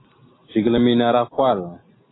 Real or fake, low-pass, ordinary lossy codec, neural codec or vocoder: real; 7.2 kHz; AAC, 16 kbps; none